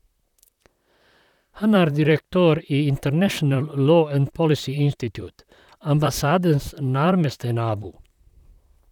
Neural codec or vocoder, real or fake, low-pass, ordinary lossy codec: vocoder, 44.1 kHz, 128 mel bands, Pupu-Vocoder; fake; 19.8 kHz; none